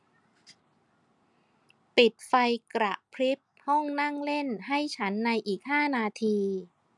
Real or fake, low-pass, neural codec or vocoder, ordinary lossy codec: real; 10.8 kHz; none; none